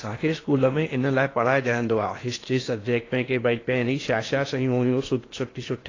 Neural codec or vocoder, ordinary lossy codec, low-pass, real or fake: codec, 16 kHz in and 24 kHz out, 0.8 kbps, FocalCodec, streaming, 65536 codes; AAC, 32 kbps; 7.2 kHz; fake